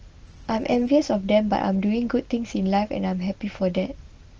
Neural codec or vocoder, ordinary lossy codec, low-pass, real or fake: none; Opus, 16 kbps; 7.2 kHz; real